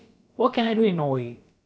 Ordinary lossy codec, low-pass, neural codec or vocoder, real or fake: none; none; codec, 16 kHz, about 1 kbps, DyCAST, with the encoder's durations; fake